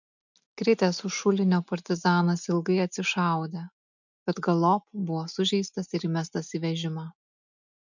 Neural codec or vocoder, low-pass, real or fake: none; 7.2 kHz; real